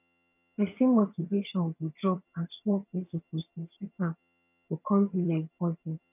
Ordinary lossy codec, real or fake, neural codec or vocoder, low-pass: none; fake; vocoder, 22.05 kHz, 80 mel bands, HiFi-GAN; 3.6 kHz